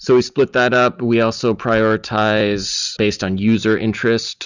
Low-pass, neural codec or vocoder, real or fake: 7.2 kHz; none; real